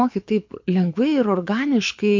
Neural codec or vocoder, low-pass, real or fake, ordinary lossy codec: codec, 24 kHz, 6 kbps, HILCodec; 7.2 kHz; fake; MP3, 48 kbps